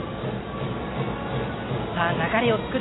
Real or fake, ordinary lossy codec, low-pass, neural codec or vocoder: real; AAC, 16 kbps; 7.2 kHz; none